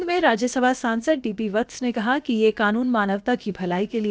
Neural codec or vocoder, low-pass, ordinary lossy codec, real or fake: codec, 16 kHz, about 1 kbps, DyCAST, with the encoder's durations; none; none; fake